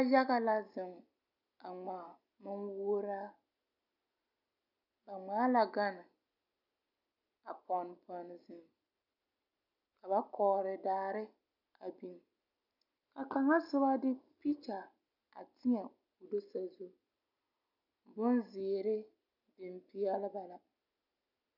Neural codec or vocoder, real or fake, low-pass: none; real; 5.4 kHz